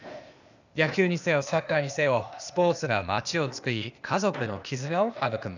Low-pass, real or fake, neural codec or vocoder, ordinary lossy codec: 7.2 kHz; fake; codec, 16 kHz, 0.8 kbps, ZipCodec; none